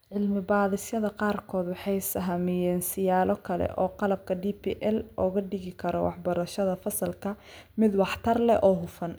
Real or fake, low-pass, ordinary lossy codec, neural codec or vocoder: real; none; none; none